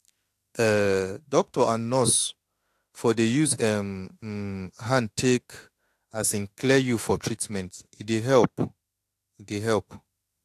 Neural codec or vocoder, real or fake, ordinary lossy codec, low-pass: autoencoder, 48 kHz, 32 numbers a frame, DAC-VAE, trained on Japanese speech; fake; AAC, 64 kbps; 14.4 kHz